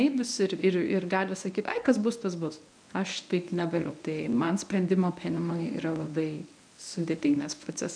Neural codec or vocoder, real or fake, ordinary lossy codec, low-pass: codec, 24 kHz, 0.9 kbps, WavTokenizer, medium speech release version 1; fake; MP3, 96 kbps; 9.9 kHz